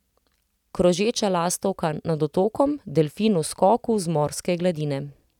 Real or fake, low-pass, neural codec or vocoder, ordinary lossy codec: real; 19.8 kHz; none; none